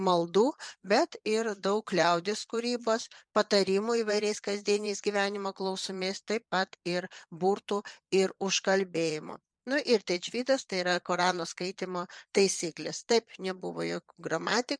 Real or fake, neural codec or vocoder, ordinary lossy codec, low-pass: fake; vocoder, 22.05 kHz, 80 mel bands, Vocos; MP3, 96 kbps; 9.9 kHz